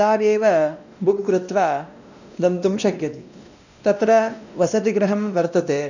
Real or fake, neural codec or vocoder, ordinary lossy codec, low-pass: fake; codec, 16 kHz, 1 kbps, X-Codec, WavLM features, trained on Multilingual LibriSpeech; none; 7.2 kHz